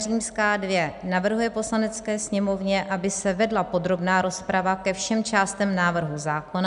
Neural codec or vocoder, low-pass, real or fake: none; 10.8 kHz; real